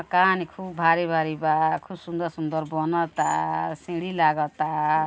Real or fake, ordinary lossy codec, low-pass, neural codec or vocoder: real; none; none; none